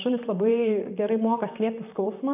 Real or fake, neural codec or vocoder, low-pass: fake; vocoder, 44.1 kHz, 128 mel bands, Pupu-Vocoder; 3.6 kHz